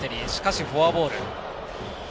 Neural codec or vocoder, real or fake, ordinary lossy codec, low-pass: none; real; none; none